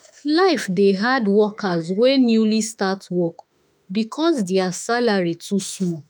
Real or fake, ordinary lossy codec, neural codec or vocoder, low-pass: fake; none; autoencoder, 48 kHz, 32 numbers a frame, DAC-VAE, trained on Japanese speech; none